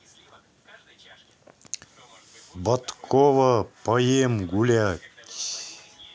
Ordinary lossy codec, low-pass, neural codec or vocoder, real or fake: none; none; none; real